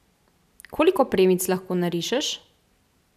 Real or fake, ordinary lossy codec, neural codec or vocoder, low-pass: real; none; none; 14.4 kHz